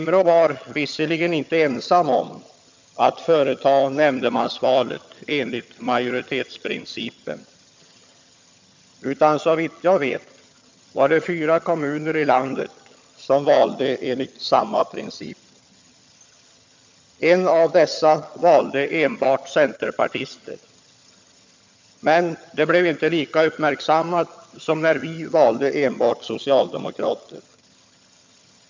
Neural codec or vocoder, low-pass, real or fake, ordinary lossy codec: vocoder, 22.05 kHz, 80 mel bands, HiFi-GAN; 7.2 kHz; fake; MP3, 64 kbps